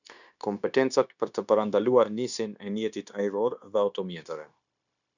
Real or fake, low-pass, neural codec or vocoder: fake; 7.2 kHz; codec, 16 kHz, 0.9 kbps, LongCat-Audio-Codec